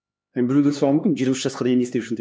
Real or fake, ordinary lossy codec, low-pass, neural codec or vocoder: fake; none; none; codec, 16 kHz, 2 kbps, X-Codec, HuBERT features, trained on LibriSpeech